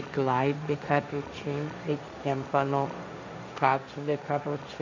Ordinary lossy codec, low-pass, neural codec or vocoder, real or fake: MP3, 64 kbps; 7.2 kHz; codec, 16 kHz, 1.1 kbps, Voila-Tokenizer; fake